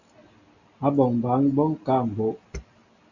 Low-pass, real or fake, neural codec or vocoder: 7.2 kHz; real; none